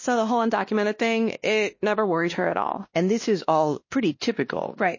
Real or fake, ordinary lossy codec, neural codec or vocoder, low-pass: fake; MP3, 32 kbps; codec, 16 kHz, 1 kbps, X-Codec, WavLM features, trained on Multilingual LibriSpeech; 7.2 kHz